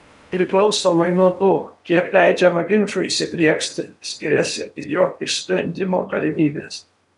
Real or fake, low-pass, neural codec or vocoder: fake; 10.8 kHz; codec, 16 kHz in and 24 kHz out, 0.8 kbps, FocalCodec, streaming, 65536 codes